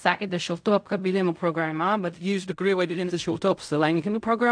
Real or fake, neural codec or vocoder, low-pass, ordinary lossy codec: fake; codec, 16 kHz in and 24 kHz out, 0.4 kbps, LongCat-Audio-Codec, fine tuned four codebook decoder; 9.9 kHz; AAC, 64 kbps